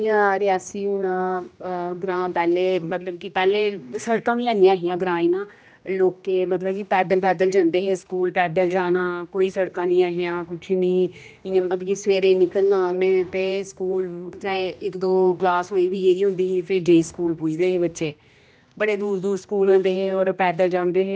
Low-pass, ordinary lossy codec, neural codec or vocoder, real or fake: none; none; codec, 16 kHz, 1 kbps, X-Codec, HuBERT features, trained on general audio; fake